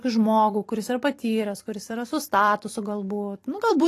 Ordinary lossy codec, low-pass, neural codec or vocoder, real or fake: AAC, 48 kbps; 14.4 kHz; vocoder, 44.1 kHz, 128 mel bands every 256 samples, BigVGAN v2; fake